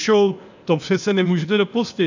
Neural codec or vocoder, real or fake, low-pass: codec, 16 kHz, 0.8 kbps, ZipCodec; fake; 7.2 kHz